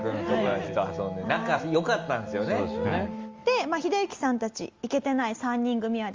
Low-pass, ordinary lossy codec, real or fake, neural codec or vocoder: 7.2 kHz; Opus, 32 kbps; real; none